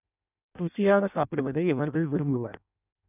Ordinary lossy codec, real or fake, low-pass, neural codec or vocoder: none; fake; 3.6 kHz; codec, 16 kHz in and 24 kHz out, 0.6 kbps, FireRedTTS-2 codec